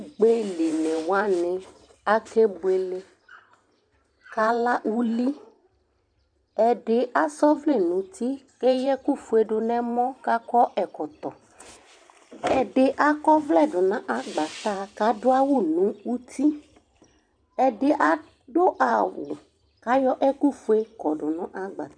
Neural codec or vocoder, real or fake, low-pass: vocoder, 44.1 kHz, 128 mel bands every 512 samples, BigVGAN v2; fake; 9.9 kHz